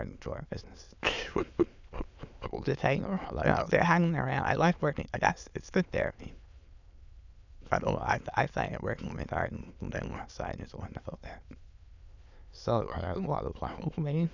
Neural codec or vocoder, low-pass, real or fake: autoencoder, 22.05 kHz, a latent of 192 numbers a frame, VITS, trained on many speakers; 7.2 kHz; fake